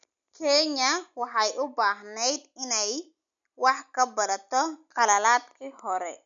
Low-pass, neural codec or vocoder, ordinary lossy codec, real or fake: 7.2 kHz; none; none; real